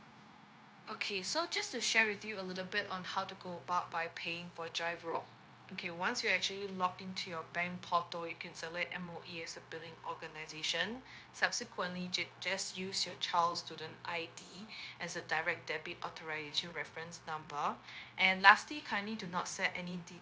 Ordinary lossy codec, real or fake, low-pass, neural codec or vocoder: none; fake; none; codec, 16 kHz, 0.9 kbps, LongCat-Audio-Codec